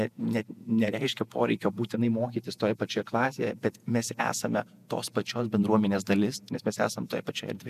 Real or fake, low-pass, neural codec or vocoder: fake; 14.4 kHz; vocoder, 48 kHz, 128 mel bands, Vocos